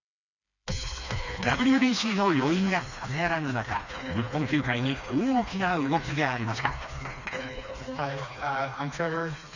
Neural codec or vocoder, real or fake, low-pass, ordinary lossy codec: codec, 16 kHz, 2 kbps, FreqCodec, smaller model; fake; 7.2 kHz; none